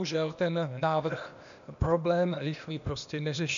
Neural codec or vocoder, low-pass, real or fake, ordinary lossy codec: codec, 16 kHz, 0.8 kbps, ZipCodec; 7.2 kHz; fake; AAC, 96 kbps